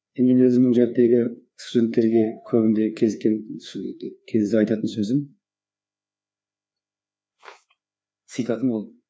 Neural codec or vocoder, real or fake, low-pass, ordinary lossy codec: codec, 16 kHz, 2 kbps, FreqCodec, larger model; fake; none; none